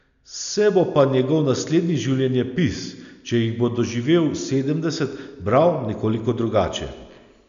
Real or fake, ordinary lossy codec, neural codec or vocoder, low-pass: real; none; none; 7.2 kHz